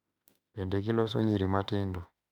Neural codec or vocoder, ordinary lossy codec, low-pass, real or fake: autoencoder, 48 kHz, 32 numbers a frame, DAC-VAE, trained on Japanese speech; none; 19.8 kHz; fake